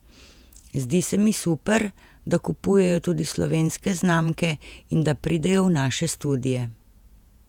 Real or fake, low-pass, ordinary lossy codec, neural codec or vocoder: fake; 19.8 kHz; none; vocoder, 48 kHz, 128 mel bands, Vocos